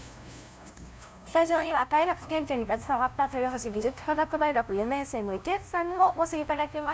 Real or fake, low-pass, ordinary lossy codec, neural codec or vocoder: fake; none; none; codec, 16 kHz, 0.5 kbps, FunCodec, trained on LibriTTS, 25 frames a second